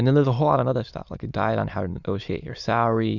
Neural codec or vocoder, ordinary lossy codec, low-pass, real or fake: autoencoder, 22.05 kHz, a latent of 192 numbers a frame, VITS, trained on many speakers; Opus, 64 kbps; 7.2 kHz; fake